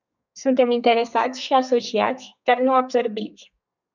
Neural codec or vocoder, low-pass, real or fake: codec, 32 kHz, 1.9 kbps, SNAC; 7.2 kHz; fake